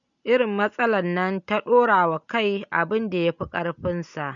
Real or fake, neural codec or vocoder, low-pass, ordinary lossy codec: real; none; 7.2 kHz; none